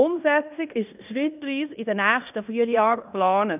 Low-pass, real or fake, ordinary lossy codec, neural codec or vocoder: 3.6 kHz; fake; none; codec, 16 kHz in and 24 kHz out, 0.9 kbps, LongCat-Audio-Codec, fine tuned four codebook decoder